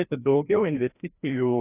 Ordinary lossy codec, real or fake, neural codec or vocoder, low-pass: AAC, 24 kbps; fake; codec, 16 kHz, 1 kbps, FreqCodec, larger model; 3.6 kHz